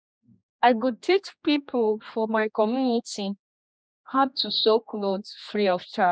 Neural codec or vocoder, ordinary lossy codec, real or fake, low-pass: codec, 16 kHz, 2 kbps, X-Codec, HuBERT features, trained on general audio; none; fake; none